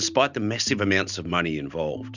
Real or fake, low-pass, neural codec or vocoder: real; 7.2 kHz; none